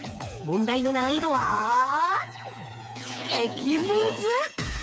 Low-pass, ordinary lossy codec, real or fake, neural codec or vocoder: none; none; fake; codec, 16 kHz, 4 kbps, FreqCodec, smaller model